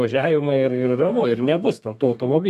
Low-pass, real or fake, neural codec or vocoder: 14.4 kHz; fake; codec, 32 kHz, 1.9 kbps, SNAC